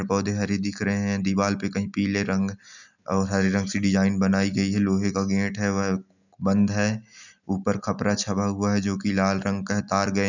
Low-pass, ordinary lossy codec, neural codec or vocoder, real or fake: 7.2 kHz; none; none; real